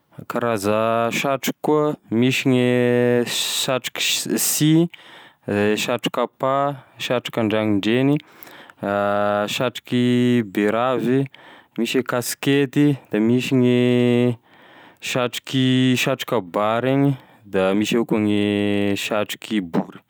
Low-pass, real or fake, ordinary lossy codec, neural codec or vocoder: none; real; none; none